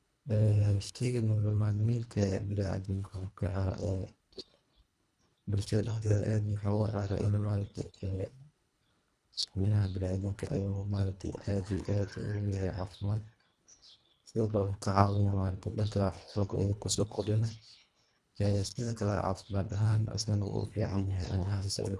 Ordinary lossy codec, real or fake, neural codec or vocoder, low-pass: none; fake; codec, 24 kHz, 1.5 kbps, HILCodec; none